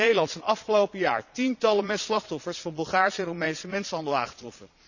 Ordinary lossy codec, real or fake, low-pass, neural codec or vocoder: none; fake; 7.2 kHz; vocoder, 22.05 kHz, 80 mel bands, Vocos